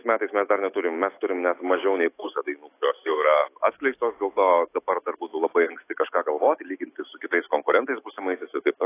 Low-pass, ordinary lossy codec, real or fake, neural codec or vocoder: 3.6 kHz; AAC, 24 kbps; fake; autoencoder, 48 kHz, 128 numbers a frame, DAC-VAE, trained on Japanese speech